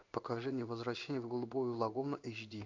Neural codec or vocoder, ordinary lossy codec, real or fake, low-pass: codec, 16 kHz in and 24 kHz out, 1 kbps, XY-Tokenizer; MP3, 64 kbps; fake; 7.2 kHz